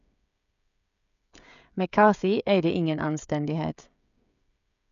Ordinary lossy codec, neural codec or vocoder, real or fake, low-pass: none; codec, 16 kHz, 16 kbps, FreqCodec, smaller model; fake; 7.2 kHz